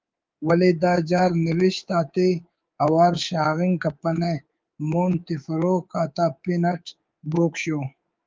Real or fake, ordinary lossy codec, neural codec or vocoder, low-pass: fake; Opus, 32 kbps; codec, 24 kHz, 3.1 kbps, DualCodec; 7.2 kHz